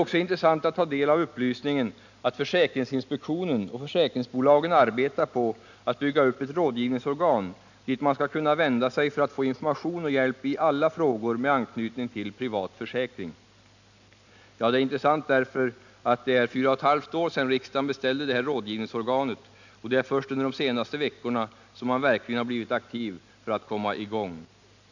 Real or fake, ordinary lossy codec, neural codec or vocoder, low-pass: real; none; none; 7.2 kHz